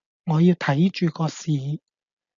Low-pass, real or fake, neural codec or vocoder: 7.2 kHz; real; none